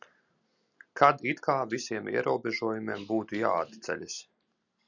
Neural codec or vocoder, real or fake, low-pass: none; real; 7.2 kHz